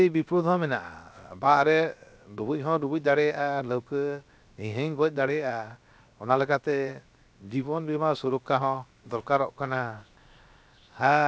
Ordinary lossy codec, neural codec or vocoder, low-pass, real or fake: none; codec, 16 kHz, 0.7 kbps, FocalCodec; none; fake